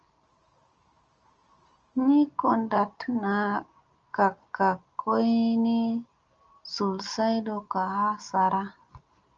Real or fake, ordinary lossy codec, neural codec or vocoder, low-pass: real; Opus, 24 kbps; none; 7.2 kHz